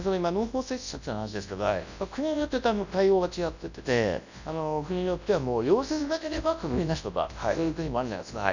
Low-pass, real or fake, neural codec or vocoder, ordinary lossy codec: 7.2 kHz; fake; codec, 24 kHz, 0.9 kbps, WavTokenizer, large speech release; none